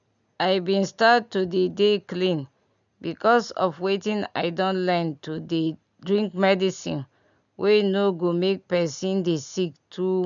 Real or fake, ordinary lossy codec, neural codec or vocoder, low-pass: real; none; none; 7.2 kHz